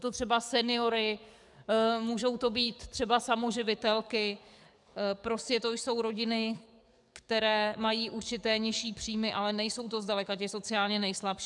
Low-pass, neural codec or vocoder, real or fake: 10.8 kHz; codec, 44.1 kHz, 7.8 kbps, DAC; fake